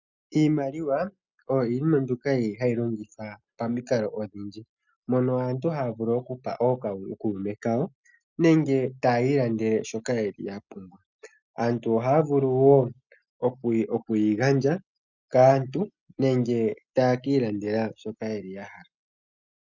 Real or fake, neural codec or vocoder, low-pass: real; none; 7.2 kHz